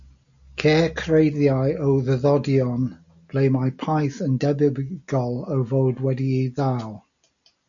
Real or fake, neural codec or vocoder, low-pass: real; none; 7.2 kHz